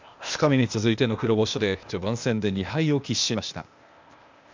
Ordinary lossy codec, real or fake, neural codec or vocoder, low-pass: MP3, 64 kbps; fake; codec, 16 kHz, 0.8 kbps, ZipCodec; 7.2 kHz